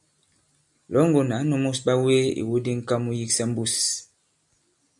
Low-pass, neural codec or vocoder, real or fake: 10.8 kHz; none; real